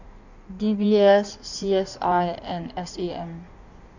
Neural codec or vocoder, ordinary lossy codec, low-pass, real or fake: codec, 16 kHz in and 24 kHz out, 1.1 kbps, FireRedTTS-2 codec; none; 7.2 kHz; fake